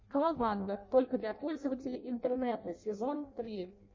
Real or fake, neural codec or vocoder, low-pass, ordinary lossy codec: fake; codec, 16 kHz in and 24 kHz out, 0.6 kbps, FireRedTTS-2 codec; 7.2 kHz; MP3, 32 kbps